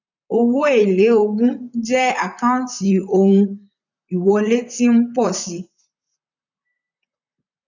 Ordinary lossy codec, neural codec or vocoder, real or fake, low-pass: none; vocoder, 44.1 kHz, 128 mel bands, Pupu-Vocoder; fake; 7.2 kHz